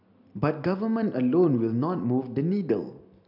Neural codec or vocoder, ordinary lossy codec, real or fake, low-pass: none; none; real; 5.4 kHz